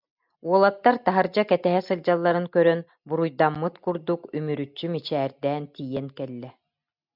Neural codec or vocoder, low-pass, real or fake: none; 5.4 kHz; real